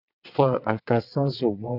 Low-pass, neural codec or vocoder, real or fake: 5.4 kHz; vocoder, 22.05 kHz, 80 mel bands, WaveNeXt; fake